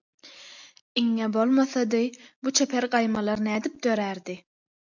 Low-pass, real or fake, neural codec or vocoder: 7.2 kHz; real; none